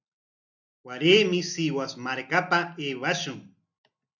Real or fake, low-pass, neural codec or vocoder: real; 7.2 kHz; none